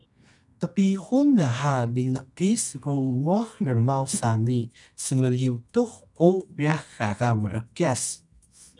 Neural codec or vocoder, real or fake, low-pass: codec, 24 kHz, 0.9 kbps, WavTokenizer, medium music audio release; fake; 10.8 kHz